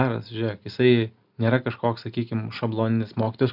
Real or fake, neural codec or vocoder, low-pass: real; none; 5.4 kHz